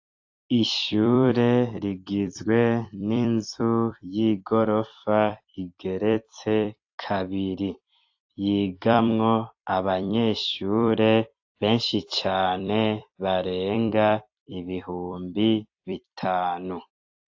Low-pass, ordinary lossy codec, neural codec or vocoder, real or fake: 7.2 kHz; AAC, 48 kbps; vocoder, 44.1 kHz, 128 mel bands every 256 samples, BigVGAN v2; fake